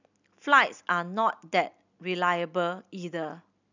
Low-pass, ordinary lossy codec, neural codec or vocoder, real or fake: 7.2 kHz; none; none; real